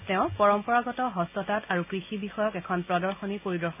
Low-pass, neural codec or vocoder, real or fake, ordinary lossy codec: 3.6 kHz; none; real; none